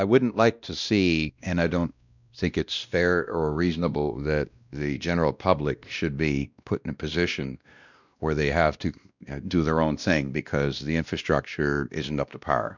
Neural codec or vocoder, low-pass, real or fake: codec, 16 kHz, 1 kbps, X-Codec, WavLM features, trained on Multilingual LibriSpeech; 7.2 kHz; fake